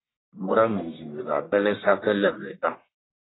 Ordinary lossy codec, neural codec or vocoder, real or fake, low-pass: AAC, 16 kbps; codec, 44.1 kHz, 1.7 kbps, Pupu-Codec; fake; 7.2 kHz